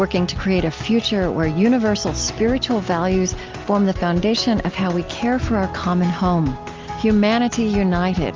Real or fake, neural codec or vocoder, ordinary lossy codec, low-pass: real; none; Opus, 32 kbps; 7.2 kHz